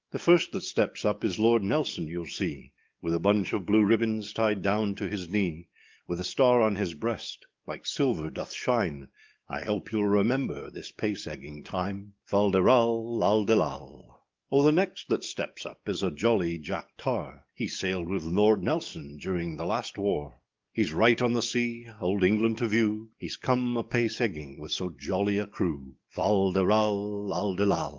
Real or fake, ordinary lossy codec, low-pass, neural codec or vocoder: fake; Opus, 24 kbps; 7.2 kHz; codec, 44.1 kHz, 7.8 kbps, DAC